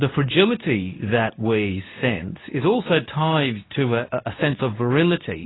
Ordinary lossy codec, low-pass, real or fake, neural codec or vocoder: AAC, 16 kbps; 7.2 kHz; fake; codec, 24 kHz, 0.9 kbps, WavTokenizer, medium speech release version 2